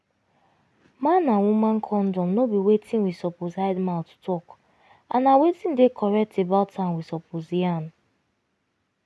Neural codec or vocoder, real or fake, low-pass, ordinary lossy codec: none; real; none; none